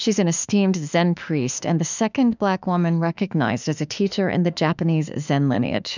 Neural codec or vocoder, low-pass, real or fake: autoencoder, 48 kHz, 32 numbers a frame, DAC-VAE, trained on Japanese speech; 7.2 kHz; fake